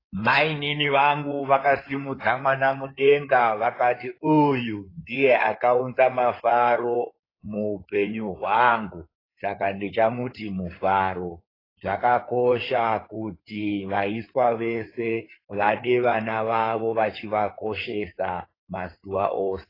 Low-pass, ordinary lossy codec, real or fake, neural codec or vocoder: 5.4 kHz; AAC, 24 kbps; fake; codec, 16 kHz in and 24 kHz out, 2.2 kbps, FireRedTTS-2 codec